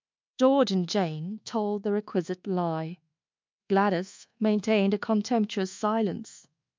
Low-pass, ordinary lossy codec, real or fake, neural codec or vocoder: 7.2 kHz; MP3, 64 kbps; fake; codec, 24 kHz, 1.2 kbps, DualCodec